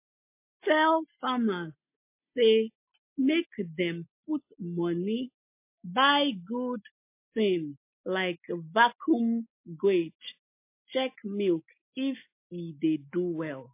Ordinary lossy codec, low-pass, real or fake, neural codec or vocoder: MP3, 24 kbps; 3.6 kHz; real; none